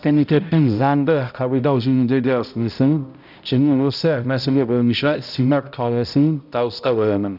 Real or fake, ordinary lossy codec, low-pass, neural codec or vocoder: fake; none; 5.4 kHz; codec, 16 kHz, 0.5 kbps, X-Codec, HuBERT features, trained on balanced general audio